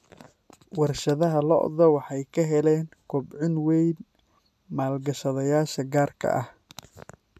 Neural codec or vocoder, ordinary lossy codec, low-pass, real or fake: none; none; 14.4 kHz; real